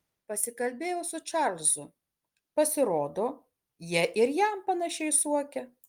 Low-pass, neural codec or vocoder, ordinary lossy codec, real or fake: 19.8 kHz; none; Opus, 32 kbps; real